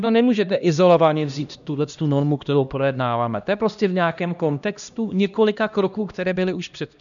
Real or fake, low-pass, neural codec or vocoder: fake; 7.2 kHz; codec, 16 kHz, 1 kbps, X-Codec, HuBERT features, trained on LibriSpeech